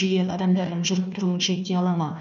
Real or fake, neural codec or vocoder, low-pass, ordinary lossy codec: fake; codec, 16 kHz, 1 kbps, FunCodec, trained on Chinese and English, 50 frames a second; 7.2 kHz; none